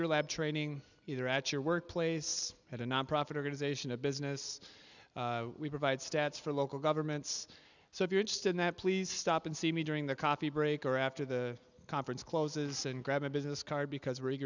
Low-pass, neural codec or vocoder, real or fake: 7.2 kHz; none; real